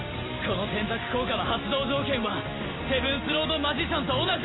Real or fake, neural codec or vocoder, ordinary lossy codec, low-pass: real; none; AAC, 16 kbps; 7.2 kHz